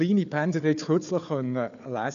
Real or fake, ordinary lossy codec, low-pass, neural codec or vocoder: fake; none; 7.2 kHz; codec, 16 kHz, 4 kbps, FunCodec, trained on Chinese and English, 50 frames a second